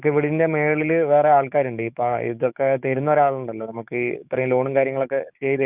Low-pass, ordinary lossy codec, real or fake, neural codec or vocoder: 3.6 kHz; none; fake; codec, 16 kHz, 6 kbps, DAC